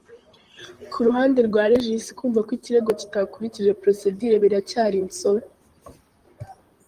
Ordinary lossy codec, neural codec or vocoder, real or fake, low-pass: Opus, 24 kbps; vocoder, 44.1 kHz, 128 mel bands, Pupu-Vocoder; fake; 14.4 kHz